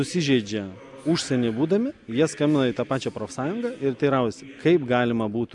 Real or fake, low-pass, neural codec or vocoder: real; 10.8 kHz; none